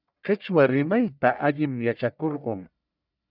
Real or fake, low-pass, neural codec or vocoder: fake; 5.4 kHz; codec, 44.1 kHz, 1.7 kbps, Pupu-Codec